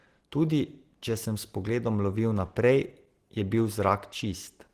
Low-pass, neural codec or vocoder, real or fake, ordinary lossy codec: 14.4 kHz; none; real; Opus, 16 kbps